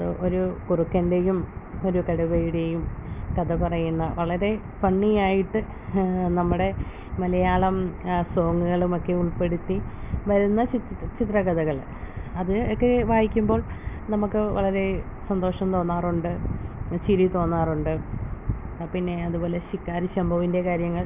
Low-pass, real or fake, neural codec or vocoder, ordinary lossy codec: 3.6 kHz; real; none; none